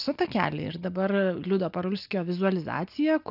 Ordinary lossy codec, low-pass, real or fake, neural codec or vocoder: AAC, 48 kbps; 5.4 kHz; real; none